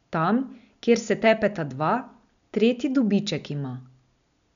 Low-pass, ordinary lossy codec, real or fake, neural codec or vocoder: 7.2 kHz; none; real; none